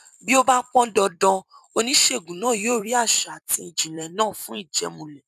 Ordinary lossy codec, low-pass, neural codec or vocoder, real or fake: none; 14.4 kHz; vocoder, 44.1 kHz, 128 mel bands every 256 samples, BigVGAN v2; fake